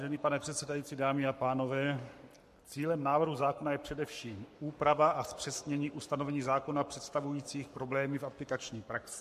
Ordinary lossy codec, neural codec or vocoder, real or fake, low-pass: MP3, 64 kbps; codec, 44.1 kHz, 7.8 kbps, Pupu-Codec; fake; 14.4 kHz